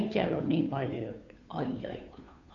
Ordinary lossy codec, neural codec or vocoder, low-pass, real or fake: none; codec, 16 kHz, 2 kbps, FunCodec, trained on Chinese and English, 25 frames a second; 7.2 kHz; fake